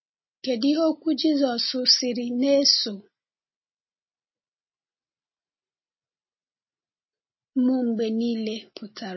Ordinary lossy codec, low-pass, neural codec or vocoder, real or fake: MP3, 24 kbps; 7.2 kHz; none; real